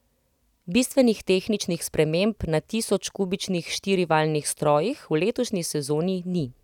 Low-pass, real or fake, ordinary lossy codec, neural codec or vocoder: 19.8 kHz; real; none; none